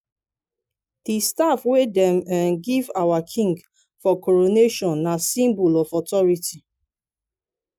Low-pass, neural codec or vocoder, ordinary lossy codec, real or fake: 19.8 kHz; none; none; real